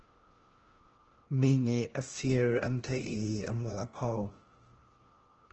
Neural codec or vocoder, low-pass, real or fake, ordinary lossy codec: codec, 16 kHz, 1 kbps, FunCodec, trained on LibriTTS, 50 frames a second; 7.2 kHz; fake; Opus, 16 kbps